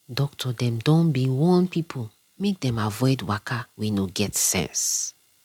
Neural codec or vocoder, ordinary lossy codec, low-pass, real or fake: none; MP3, 96 kbps; 19.8 kHz; real